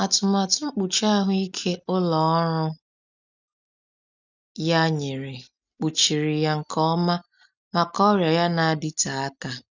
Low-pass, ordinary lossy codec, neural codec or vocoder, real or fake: 7.2 kHz; none; none; real